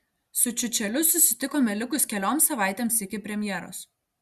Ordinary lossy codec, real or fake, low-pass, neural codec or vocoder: Opus, 64 kbps; real; 14.4 kHz; none